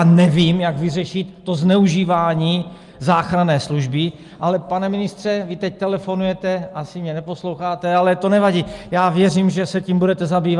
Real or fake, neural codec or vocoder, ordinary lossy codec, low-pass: real; none; Opus, 32 kbps; 10.8 kHz